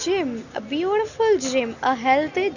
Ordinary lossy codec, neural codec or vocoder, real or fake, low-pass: none; none; real; 7.2 kHz